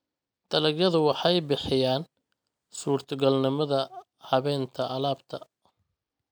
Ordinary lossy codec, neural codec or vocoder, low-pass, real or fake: none; none; none; real